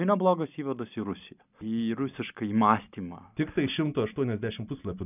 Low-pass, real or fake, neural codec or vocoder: 3.6 kHz; real; none